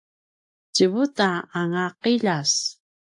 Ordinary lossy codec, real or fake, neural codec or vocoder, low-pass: AAC, 64 kbps; real; none; 10.8 kHz